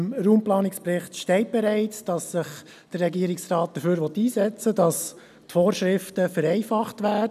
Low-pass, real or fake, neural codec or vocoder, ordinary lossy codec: 14.4 kHz; real; none; none